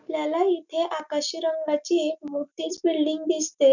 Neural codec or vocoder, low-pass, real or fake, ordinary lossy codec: none; 7.2 kHz; real; none